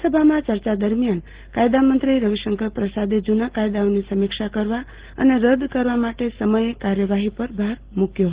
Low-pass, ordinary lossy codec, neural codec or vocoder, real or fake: 3.6 kHz; Opus, 16 kbps; none; real